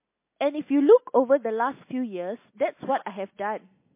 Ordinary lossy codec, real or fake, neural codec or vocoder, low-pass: MP3, 24 kbps; real; none; 3.6 kHz